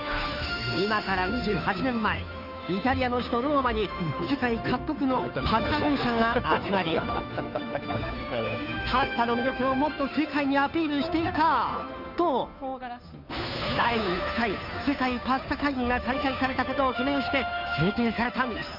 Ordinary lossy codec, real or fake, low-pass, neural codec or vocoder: none; fake; 5.4 kHz; codec, 16 kHz, 2 kbps, FunCodec, trained on Chinese and English, 25 frames a second